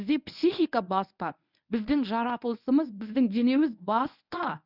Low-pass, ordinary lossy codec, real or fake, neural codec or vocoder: 5.4 kHz; none; fake; codec, 24 kHz, 0.9 kbps, WavTokenizer, medium speech release version 1